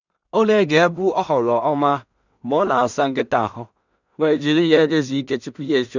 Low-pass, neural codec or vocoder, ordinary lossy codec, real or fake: 7.2 kHz; codec, 16 kHz in and 24 kHz out, 0.4 kbps, LongCat-Audio-Codec, two codebook decoder; none; fake